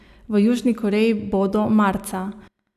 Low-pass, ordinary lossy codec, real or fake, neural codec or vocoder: 14.4 kHz; none; real; none